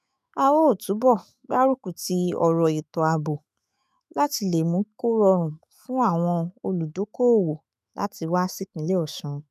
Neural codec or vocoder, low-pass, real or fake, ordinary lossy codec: autoencoder, 48 kHz, 128 numbers a frame, DAC-VAE, trained on Japanese speech; 14.4 kHz; fake; none